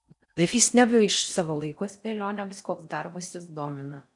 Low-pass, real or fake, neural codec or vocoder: 10.8 kHz; fake; codec, 16 kHz in and 24 kHz out, 0.6 kbps, FocalCodec, streaming, 4096 codes